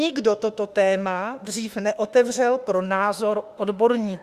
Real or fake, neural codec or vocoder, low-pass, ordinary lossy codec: fake; autoencoder, 48 kHz, 32 numbers a frame, DAC-VAE, trained on Japanese speech; 14.4 kHz; Opus, 64 kbps